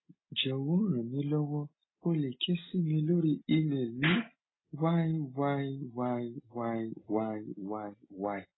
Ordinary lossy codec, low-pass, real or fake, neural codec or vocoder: AAC, 16 kbps; 7.2 kHz; real; none